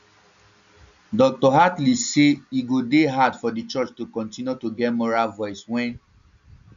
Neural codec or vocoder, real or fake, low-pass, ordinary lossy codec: none; real; 7.2 kHz; none